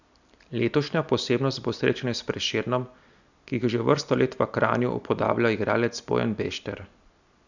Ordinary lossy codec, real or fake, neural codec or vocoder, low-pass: none; real; none; 7.2 kHz